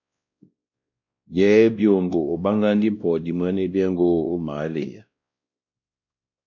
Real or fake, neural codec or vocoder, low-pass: fake; codec, 16 kHz, 1 kbps, X-Codec, WavLM features, trained on Multilingual LibriSpeech; 7.2 kHz